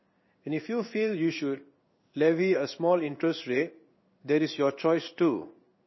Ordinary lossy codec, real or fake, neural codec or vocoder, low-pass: MP3, 24 kbps; fake; codec, 16 kHz in and 24 kHz out, 1 kbps, XY-Tokenizer; 7.2 kHz